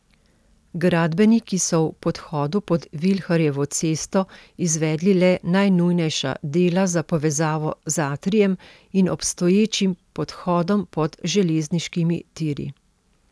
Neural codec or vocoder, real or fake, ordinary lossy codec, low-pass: none; real; none; none